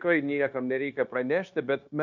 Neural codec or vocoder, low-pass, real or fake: codec, 16 kHz, 0.9 kbps, LongCat-Audio-Codec; 7.2 kHz; fake